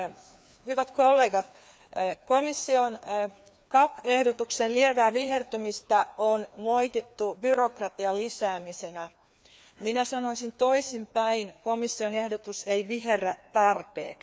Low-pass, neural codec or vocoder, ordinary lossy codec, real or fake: none; codec, 16 kHz, 2 kbps, FreqCodec, larger model; none; fake